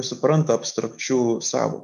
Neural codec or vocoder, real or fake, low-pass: autoencoder, 48 kHz, 128 numbers a frame, DAC-VAE, trained on Japanese speech; fake; 14.4 kHz